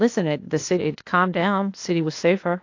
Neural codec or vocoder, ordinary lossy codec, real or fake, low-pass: codec, 16 kHz, 0.8 kbps, ZipCodec; AAC, 48 kbps; fake; 7.2 kHz